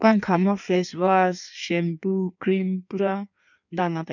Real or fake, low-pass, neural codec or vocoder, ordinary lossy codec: fake; 7.2 kHz; codec, 16 kHz in and 24 kHz out, 1.1 kbps, FireRedTTS-2 codec; none